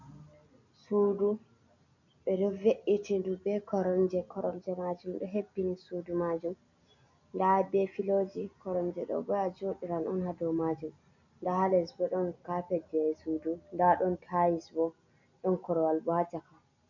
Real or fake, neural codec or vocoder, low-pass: real; none; 7.2 kHz